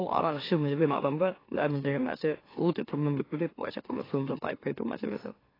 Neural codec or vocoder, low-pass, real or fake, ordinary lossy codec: autoencoder, 44.1 kHz, a latent of 192 numbers a frame, MeloTTS; 5.4 kHz; fake; AAC, 24 kbps